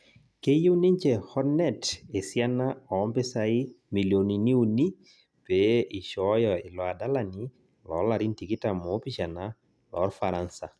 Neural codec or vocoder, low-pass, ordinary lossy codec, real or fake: none; none; none; real